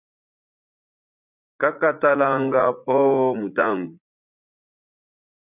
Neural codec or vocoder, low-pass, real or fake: vocoder, 44.1 kHz, 80 mel bands, Vocos; 3.6 kHz; fake